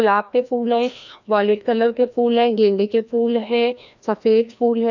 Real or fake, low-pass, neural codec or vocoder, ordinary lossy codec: fake; 7.2 kHz; codec, 16 kHz, 1 kbps, FreqCodec, larger model; none